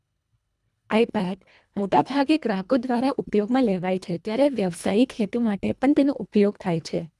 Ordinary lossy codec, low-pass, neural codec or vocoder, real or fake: none; none; codec, 24 kHz, 1.5 kbps, HILCodec; fake